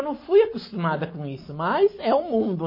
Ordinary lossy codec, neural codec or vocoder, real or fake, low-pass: MP3, 24 kbps; none; real; 5.4 kHz